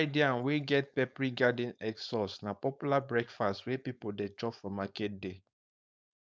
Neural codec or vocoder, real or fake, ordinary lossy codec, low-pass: codec, 16 kHz, 4.8 kbps, FACodec; fake; none; none